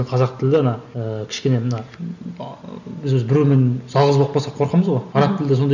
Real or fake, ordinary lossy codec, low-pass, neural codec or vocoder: real; none; 7.2 kHz; none